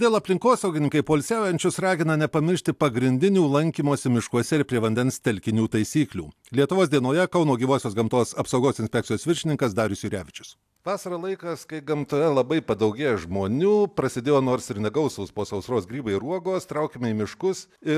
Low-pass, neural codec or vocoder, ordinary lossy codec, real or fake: 14.4 kHz; none; AAC, 96 kbps; real